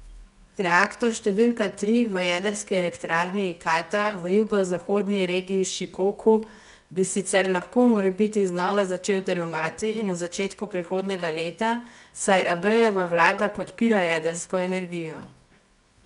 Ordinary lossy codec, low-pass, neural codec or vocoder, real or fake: none; 10.8 kHz; codec, 24 kHz, 0.9 kbps, WavTokenizer, medium music audio release; fake